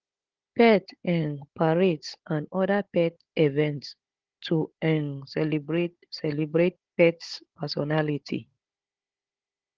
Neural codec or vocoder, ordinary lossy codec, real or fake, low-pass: codec, 16 kHz, 16 kbps, FunCodec, trained on Chinese and English, 50 frames a second; Opus, 16 kbps; fake; 7.2 kHz